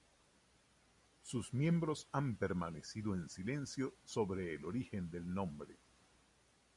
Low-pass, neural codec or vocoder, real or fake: 10.8 kHz; none; real